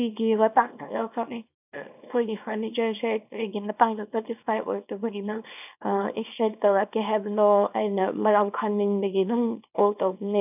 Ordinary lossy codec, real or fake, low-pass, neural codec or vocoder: none; fake; 3.6 kHz; codec, 24 kHz, 0.9 kbps, WavTokenizer, small release